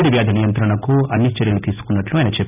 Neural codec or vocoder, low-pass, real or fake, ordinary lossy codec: none; 3.6 kHz; real; none